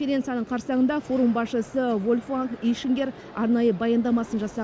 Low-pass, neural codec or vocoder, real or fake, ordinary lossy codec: none; none; real; none